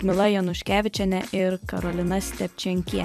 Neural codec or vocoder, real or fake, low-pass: vocoder, 44.1 kHz, 128 mel bands every 256 samples, BigVGAN v2; fake; 14.4 kHz